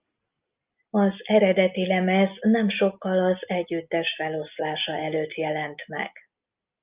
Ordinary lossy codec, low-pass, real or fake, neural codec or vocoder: Opus, 24 kbps; 3.6 kHz; real; none